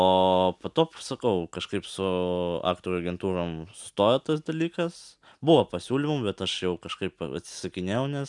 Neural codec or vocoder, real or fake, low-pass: none; real; 10.8 kHz